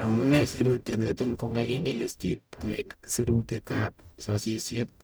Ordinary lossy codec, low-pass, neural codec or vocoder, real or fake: none; none; codec, 44.1 kHz, 0.9 kbps, DAC; fake